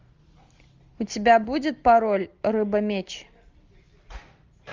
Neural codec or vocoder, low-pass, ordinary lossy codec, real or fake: none; 7.2 kHz; Opus, 32 kbps; real